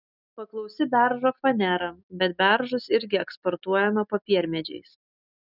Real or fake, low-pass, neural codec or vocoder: real; 5.4 kHz; none